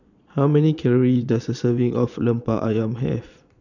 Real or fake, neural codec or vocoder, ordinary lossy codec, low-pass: fake; vocoder, 22.05 kHz, 80 mel bands, Vocos; none; 7.2 kHz